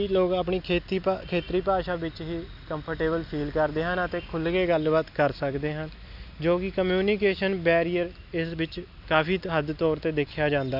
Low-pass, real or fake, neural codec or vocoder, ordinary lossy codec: 5.4 kHz; real; none; none